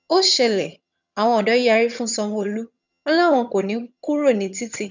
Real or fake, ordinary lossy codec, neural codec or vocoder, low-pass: fake; none; vocoder, 22.05 kHz, 80 mel bands, HiFi-GAN; 7.2 kHz